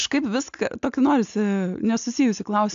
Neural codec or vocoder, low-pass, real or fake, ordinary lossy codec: none; 7.2 kHz; real; AAC, 64 kbps